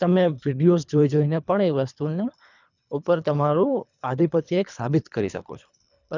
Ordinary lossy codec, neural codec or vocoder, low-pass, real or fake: none; codec, 24 kHz, 3 kbps, HILCodec; 7.2 kHz; fake